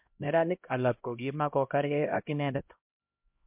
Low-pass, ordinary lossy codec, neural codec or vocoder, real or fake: 3.6 kHz; MP3, 32 kbps; codec, 16 kHz, 0.5 kbps, X-Codec, HuBERT features, trained on LibriSpeech; fake